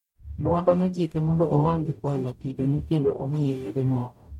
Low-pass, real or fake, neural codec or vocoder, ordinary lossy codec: 19.8 kHz; fake; codec, 44.1 kHz, 0.9 kbps, DAC; MP3, 64 kbps